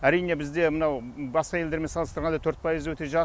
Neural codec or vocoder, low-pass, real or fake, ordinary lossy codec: none; none; real; none